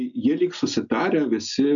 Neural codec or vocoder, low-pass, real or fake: none; 7.2 kHz; real